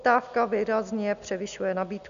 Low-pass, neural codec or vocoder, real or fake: 7.2 kHz; none; real